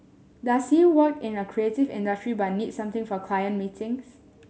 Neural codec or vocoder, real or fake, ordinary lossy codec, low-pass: none; real; none; none